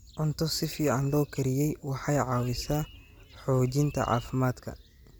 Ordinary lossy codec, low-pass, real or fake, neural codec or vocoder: none; none; real; none